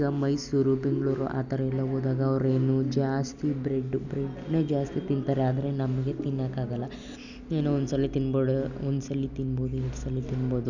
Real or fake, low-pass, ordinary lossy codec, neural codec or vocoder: real; 7.2 kHz; none; none